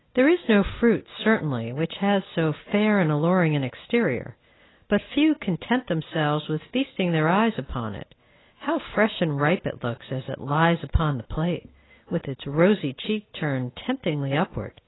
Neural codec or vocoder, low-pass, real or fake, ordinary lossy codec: none; 7.2 kHz; real; AAC, 16 kbps